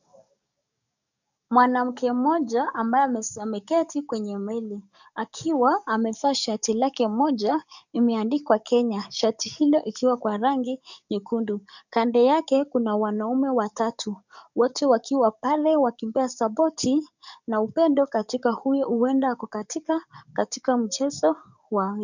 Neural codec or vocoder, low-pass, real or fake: codec, 44.1 kHz, 7.8 kbps, DAC; 7.2 kHz; fake